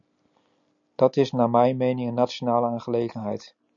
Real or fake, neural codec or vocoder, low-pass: real; none; 7.2 kHz